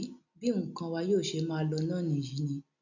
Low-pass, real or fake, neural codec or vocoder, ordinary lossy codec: 7.2 kHz; real; none; none